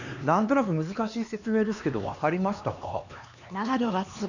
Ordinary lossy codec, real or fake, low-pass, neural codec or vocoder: none; fake; 7.2 kHz; codec, 16 kHz, 2 kbps, X-Codec, HuBERT features, trained on LibriSpeech